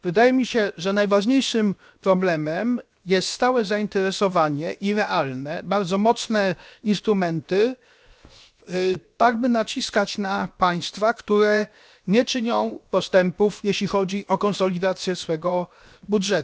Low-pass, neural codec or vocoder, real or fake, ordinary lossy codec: none; codec, 16 kHz, 0.7 kbps, FocalCodec; fake; none